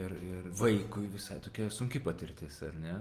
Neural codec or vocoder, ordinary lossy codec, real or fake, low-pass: none; Opus, 24 kbps; real; 14.4 kHz